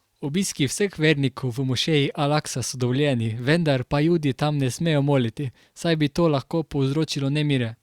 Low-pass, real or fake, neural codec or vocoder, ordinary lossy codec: 19.8 kHz; fake; vocoder, 44.1 kHz, 128 mel bands every 256 samples, BigVGAN v2; Opus, 64 kbps